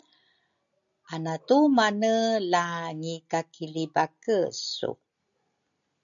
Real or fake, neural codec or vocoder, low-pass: real; none; 7.2 kHz